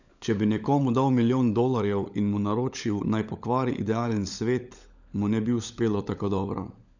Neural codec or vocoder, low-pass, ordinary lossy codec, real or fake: codec, 16 kHz, 16 kbps, FunCodec, trained on LibriTTS, 50 frames a second; 7.2 kHz; none; fake